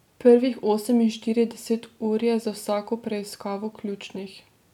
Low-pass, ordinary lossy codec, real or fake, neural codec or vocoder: 19.8 kHz; none; real; none